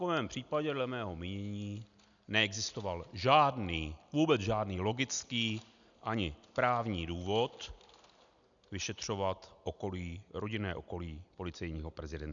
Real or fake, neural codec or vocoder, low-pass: real; none; 7.2 kHz